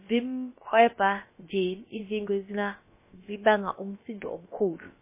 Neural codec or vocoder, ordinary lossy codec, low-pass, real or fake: codec, 16 kHz, about 1 kbps, DyCAST, with the encoder's durations; MP3, 16 kbps; 3.6 kHz; fake